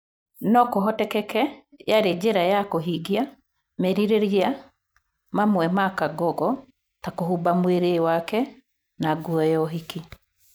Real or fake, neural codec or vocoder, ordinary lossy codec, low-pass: real; none; none; none